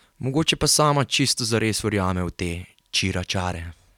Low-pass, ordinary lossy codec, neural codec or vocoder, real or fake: 19.8 kHz; none; none; real